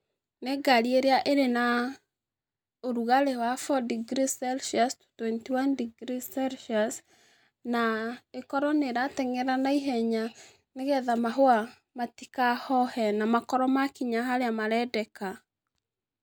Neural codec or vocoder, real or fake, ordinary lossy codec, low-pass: none; real; none; none